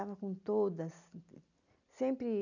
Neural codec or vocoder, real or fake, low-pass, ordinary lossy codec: none; real; 7.2 kHz; none